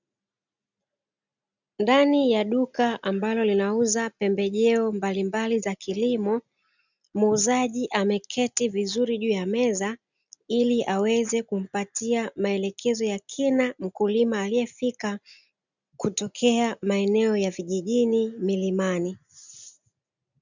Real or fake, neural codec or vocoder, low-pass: real; none; 7.2 kHz